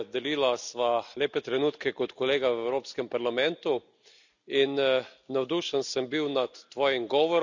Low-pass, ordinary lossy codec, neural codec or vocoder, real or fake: 7.2 kHz; none; none; real